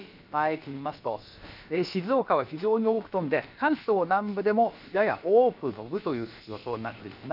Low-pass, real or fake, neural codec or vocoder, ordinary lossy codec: 5.4 kHz; fake; codec, 16 kHz, about 1 kbps, DyCAST, with the encoder's durations; none